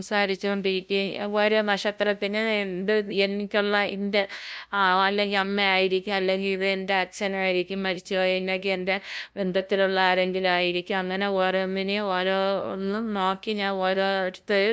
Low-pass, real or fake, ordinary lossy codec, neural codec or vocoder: none; fake; none; codec, 16 kHz, 0.5 kbps, FunCodec, trained on LibriTTS, 25 frames a second